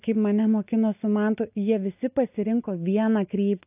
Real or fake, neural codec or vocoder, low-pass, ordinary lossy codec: real; none; 3.6 kHz; AAC, 32 kbps